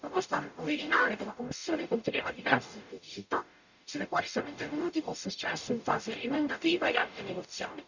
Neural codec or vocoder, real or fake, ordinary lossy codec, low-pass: codec, 44.1 kHz, 0.9 kbps, DAC; fake; none; 7.2 kHz